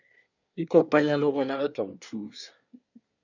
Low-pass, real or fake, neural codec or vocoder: 7.2 kHz; fake; codec, 24 kHz, 1 kbps, SNAC